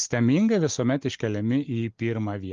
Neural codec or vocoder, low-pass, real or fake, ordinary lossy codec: none; 7.2 kHz; real; Opus, 24 kbps